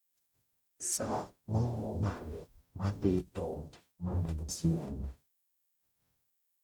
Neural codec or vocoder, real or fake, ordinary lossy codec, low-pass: codec, 44.1 kHz, 0.9 kbps, DAC; fake; none; 19.8 kHz